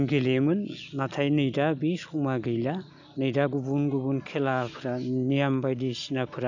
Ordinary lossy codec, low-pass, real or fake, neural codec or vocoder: none; 7.2 kHz; real; none